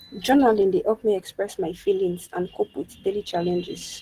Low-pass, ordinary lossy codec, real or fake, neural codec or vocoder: 14.4 kHz; Opus, 16 kbps; real; none